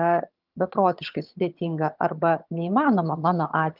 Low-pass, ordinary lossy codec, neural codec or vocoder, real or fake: 5.4 kHz; Opus, 24 kbps; vocoder, 22.05 kHz, 80 mel bands, HiFi-GAN; fake